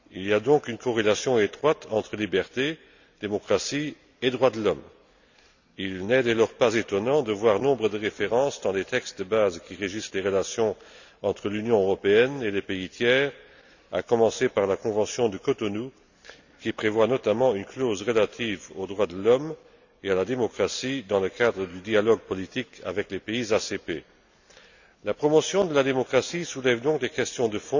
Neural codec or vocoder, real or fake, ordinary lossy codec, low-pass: none; real; none; 7.2 kHz